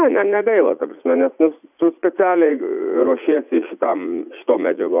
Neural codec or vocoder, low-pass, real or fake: vocoder, 44.1 kHz, 80 mel bands, Vocos; 3.6 kHz; fake